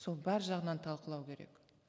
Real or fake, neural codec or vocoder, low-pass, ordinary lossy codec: real; none; none; none